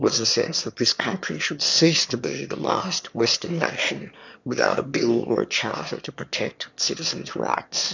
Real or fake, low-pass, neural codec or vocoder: fake; 7.2 kHz; autoencoder, 22.05 kHz, a latent of 192 numbers a frame, VITS, trained on one speaker